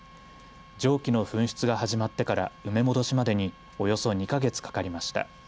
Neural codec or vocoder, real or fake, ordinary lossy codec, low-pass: none; real; none; none